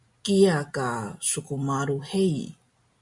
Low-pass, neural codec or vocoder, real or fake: 10.8 kHz; none; real